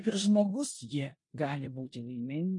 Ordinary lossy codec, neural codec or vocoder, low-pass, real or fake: MP3, 48 kbps; codec, 16 kHz in and 24 kHz out, 0.9 kbps, LongCat-Audio-Codec, four codebook decoder; 10.8 kHz; fake